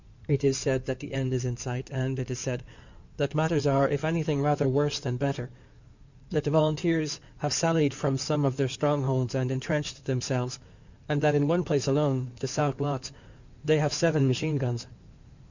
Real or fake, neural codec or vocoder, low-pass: fake; codec, 16 kHz in and 24 kHz out, 2.2 kbps, FireRedTTS-2 codec; 7.2 kHz